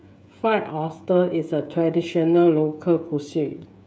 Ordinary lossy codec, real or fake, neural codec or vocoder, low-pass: none; fake; codec, 16 kHz, 16 kbps, FreqCodec, smaller model; none